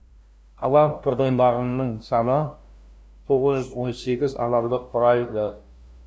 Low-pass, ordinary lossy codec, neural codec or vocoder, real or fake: none; none; codec, 16 kHz, 0.5 kbps, FunCodec, trained on LibriTTS, 25 frames a second; fake